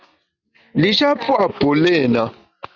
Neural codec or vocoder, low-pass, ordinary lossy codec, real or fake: none; 7.2 kHz; Opus, 64 kbps; real